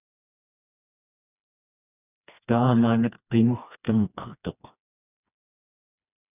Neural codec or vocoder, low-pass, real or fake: codec, 16 kHz, 2 kbps, FreqCodec, smaller model; 3.6 kHz; fake